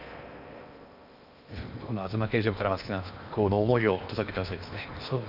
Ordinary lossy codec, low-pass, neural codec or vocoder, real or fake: none; 5.4 kHz; codec, 16 kHz in and 24 kHz out, 0.8 kbps, FocalCodec, streaming, 65536 codes; fake